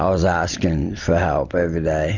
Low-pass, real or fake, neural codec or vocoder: 7.2 kHz; real; none